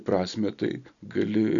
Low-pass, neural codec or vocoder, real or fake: 7.2 kHz; none; real